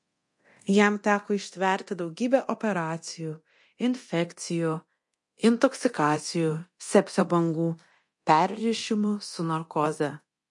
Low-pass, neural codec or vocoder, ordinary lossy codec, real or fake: 10.8 kHz; codec, 24 kHz, 0.9 kbps, DualCodec; MP3, 48 kbps; fake